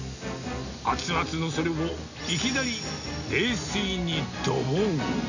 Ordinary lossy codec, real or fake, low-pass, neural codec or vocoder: none; real; 7.2 kHz; none